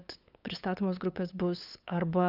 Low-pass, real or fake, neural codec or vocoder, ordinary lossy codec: 5.4 kHz; fake; codec, 16 kHz, 8 kbps, FunCodec, trained on LibriTTS, 25 frames a second; Opus, 64 kbps